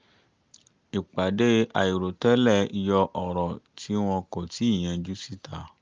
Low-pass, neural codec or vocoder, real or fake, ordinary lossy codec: 7.2 kHz; none; real; Opus, 24 kbps